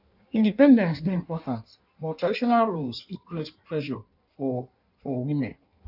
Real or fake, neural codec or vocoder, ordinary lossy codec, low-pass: fake; codec, 16 kHz in and 24 kHz out, 1.1 kbps, FireRedTTS-2 codec; none; 5.4 kHz